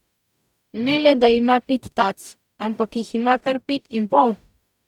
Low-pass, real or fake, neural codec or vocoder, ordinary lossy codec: 19.8 kHz; fake; codec, 44.1 kHz, 0.9 kbps, DAC; none